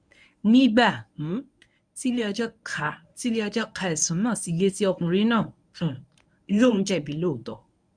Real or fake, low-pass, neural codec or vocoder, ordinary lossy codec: fake; 9.9 kHz; codec, 24 kHz, 0.9 kbps, WavTokenizer, medium speech release version 1; none